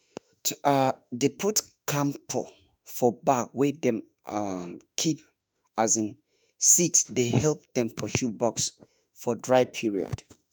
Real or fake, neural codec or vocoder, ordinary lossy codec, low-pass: fake; autoencoder, 48 kHz, 32 numbers a frame, DAC-VAE, trained on Japanese speech; none; none